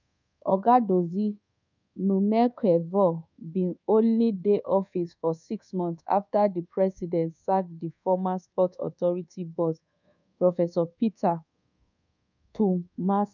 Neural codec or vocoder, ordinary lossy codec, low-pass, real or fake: codec, 24 kHz, 1.2 kbps, DualCodec; none; 7.2 kHz; fake